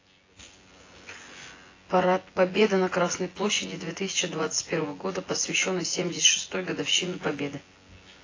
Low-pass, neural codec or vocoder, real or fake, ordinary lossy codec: 7.2 kHz; vocoder, 24 kHz, 100 mel bands, Vocos; fake; AAC, 32 kbps